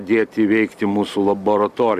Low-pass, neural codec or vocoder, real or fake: 14.4 kHz; none; real